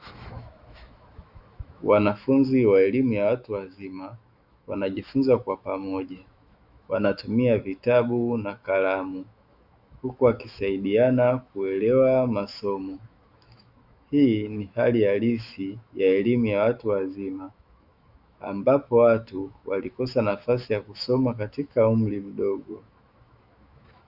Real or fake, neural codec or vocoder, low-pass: fake; autoencoder, 48 kHz, 128 numbers a frame, DAC-VAE, trained on Japanese speech; 5.4 kHz